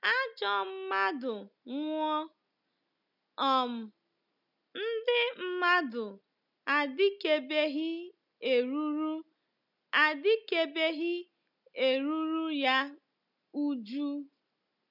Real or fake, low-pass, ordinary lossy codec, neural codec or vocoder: real; 5.4 kHz; none; none